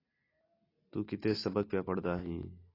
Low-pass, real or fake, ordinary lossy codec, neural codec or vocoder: 5.4 kHz; real; AAC, 24 kbps; none